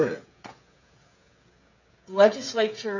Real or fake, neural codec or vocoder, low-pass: fake; codec, 16 kHz, 16 kbps, FreqCodec, smaller model; 7.2 kHz